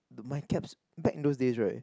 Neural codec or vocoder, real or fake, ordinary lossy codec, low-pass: none; real; none; none